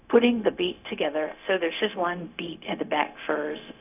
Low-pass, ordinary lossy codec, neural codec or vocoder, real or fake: 3.6 kHz; none; codec, 16 kHz, 0.4 kbps, LongCat-Audio-Codec; fake